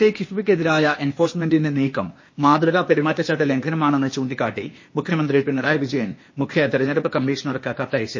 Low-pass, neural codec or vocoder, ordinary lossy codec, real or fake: 7.2 kHz; codec, 16 kHz, 0.8 kbps, ZipCodec; MP3, 32 kbps; fake